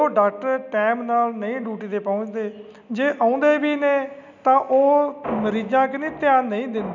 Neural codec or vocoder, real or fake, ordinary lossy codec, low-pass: none; real; none; 7.2 kHz